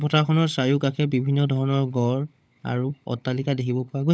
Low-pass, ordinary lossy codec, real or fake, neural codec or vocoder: none; none; fake; codec, 16 kHz, 16 kbps, FunCodec, trained on LibriTTS, 50 frames a second